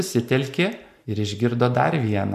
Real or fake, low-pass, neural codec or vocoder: real; 14.4 kHz; none